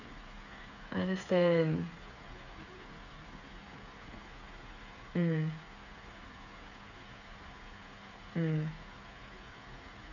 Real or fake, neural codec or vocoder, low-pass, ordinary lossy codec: fake; codec, 16 kHz, 8 kbps, FreqCodec, smaller model; 7.2 kHz; none